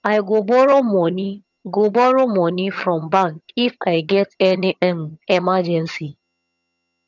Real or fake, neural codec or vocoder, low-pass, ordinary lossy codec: fake; vocoder, 22.05 kHz, 80 mel bands, HiFi-GAN; 7.2 kHz; none